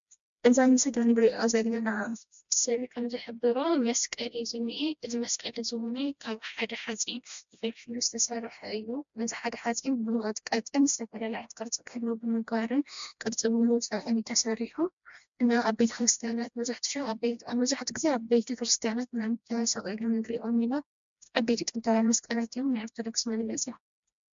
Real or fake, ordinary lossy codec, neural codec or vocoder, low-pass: fake; MP3, 64 kbps; codec, 16 kHz, 1 kbps, FreqCodec, smaller model; 7.2 kHz